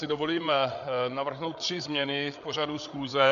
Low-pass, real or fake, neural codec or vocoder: 7.2 kHz; fake; codec, 16 kHz, 8 kbps, FreqCodec, larger model